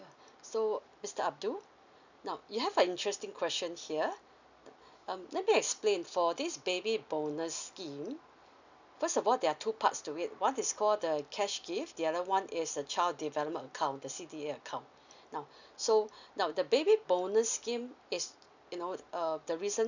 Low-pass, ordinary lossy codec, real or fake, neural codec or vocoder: 7.2 kHz; none; real; none